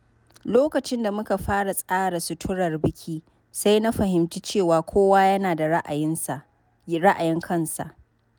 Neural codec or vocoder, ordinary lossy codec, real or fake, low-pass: none; none; real; none